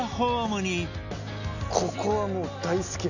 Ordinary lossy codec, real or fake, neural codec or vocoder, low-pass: none; real; none; 7.2 kHz